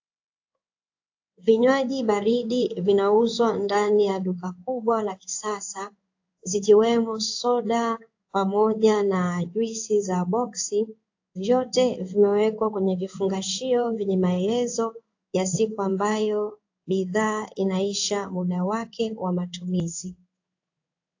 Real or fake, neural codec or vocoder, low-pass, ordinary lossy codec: fake; codec, 16 kHz in and 24 kHz out, 1 kbps, XY-Tokenizer; 7.2 kHz; AAC, 48 kbps